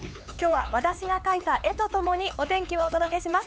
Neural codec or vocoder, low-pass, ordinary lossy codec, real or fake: codec, 16 kHz, 4 kbps, X-Codec, HuBERT features, trained on LibriSpeech; none; none; fake